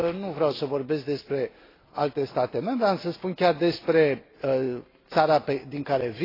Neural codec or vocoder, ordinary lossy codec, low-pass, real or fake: none; AAC, 24 kbps; 5.4 kHz; real